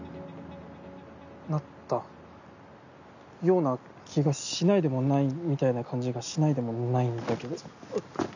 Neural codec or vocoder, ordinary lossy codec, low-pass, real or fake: none; none; 7.2 kHz; real